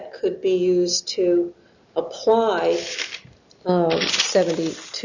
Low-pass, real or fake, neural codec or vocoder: 7.2 kHz; real; none